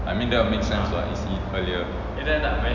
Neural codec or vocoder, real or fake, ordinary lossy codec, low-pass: none; real; none; 7.2 kHz